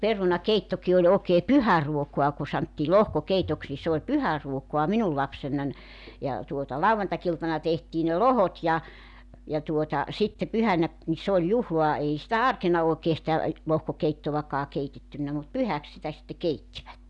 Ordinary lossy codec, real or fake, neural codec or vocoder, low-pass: none; real; none; 10.8 kHz